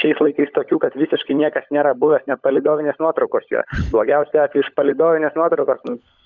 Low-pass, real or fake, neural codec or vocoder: 7.2 kHz; fake; codec, 16 kHz, 16 kbps, FunCodec, trained on LibriTTS, 50 frames a second